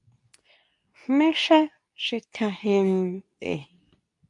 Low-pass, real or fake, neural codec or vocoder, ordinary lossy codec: 10.8 kHz; fake; codec, 24 kHz, 0.9 kbps, WavTokenizer, medium speech release version 2; AAC, 64 kbps